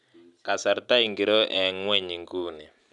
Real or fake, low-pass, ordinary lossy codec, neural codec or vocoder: real; 10.8 kHz; none; none